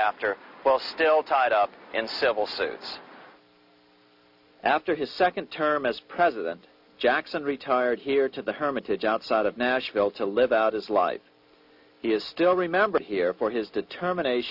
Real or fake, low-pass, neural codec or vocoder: real; 5.4 kHz; none